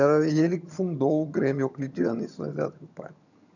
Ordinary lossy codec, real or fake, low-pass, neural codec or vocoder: none; fake; 7.2 kHz; vocoder, 22.05 kHz, 80 mel bands, HiFi-GAN